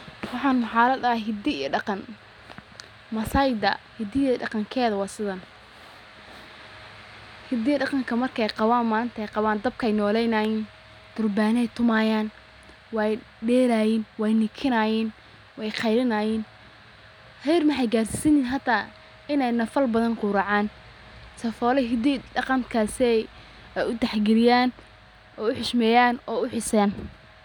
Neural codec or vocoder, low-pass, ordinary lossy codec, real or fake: none; 19.8 kHz; none; real